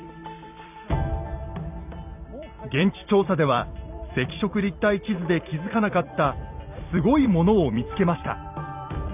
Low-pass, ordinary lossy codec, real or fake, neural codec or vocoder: 3.6 kHz; none; fake; vocoder, 44.1 kHz, 128 mel bands every 256 samples, BigVGAN v2